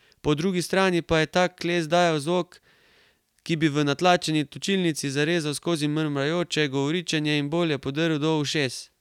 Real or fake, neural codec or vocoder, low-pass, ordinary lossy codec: real; none; 19.8 kHz; none